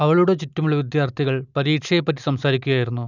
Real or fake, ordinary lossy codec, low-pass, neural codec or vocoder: real; none; 7.2 kHz; none